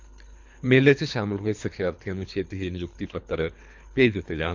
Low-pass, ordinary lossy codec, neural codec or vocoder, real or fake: 7.2 kHz; MP3, 64 kbps; codec, 24 kHz, 3 kbps, HILCodec; fake